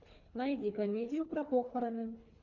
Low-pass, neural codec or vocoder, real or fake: 7.2 kHz; codec, 24 kHz, 3 kbps, HILCodec; fake